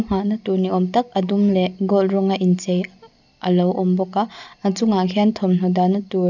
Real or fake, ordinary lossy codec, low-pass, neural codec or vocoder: real; none; 7.2 kHz; none